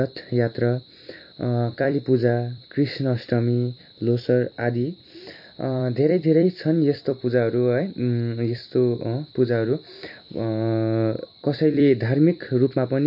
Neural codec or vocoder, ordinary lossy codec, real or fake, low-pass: vocoder, 44.1 kHz, 128 mel bands every 256 samples, BigVGAN v2; MP3, 32 kbps; fake; 5.4 kHz